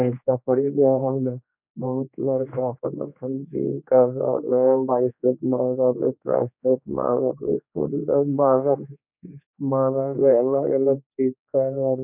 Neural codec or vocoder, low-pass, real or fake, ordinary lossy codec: codec, 16 kHz, 2 kbps, X-Codec, HuBERT features, trained on general audio; 3.6 kHz; fake; none